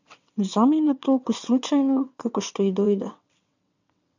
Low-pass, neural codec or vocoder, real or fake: 7.2 kHz; vocoder, 22.05 kHz, 80 mel bands, WaveNeXt; fake